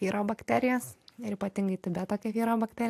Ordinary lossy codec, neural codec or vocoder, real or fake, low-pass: AAC, 64 kbps; none; real; 14.4 kHz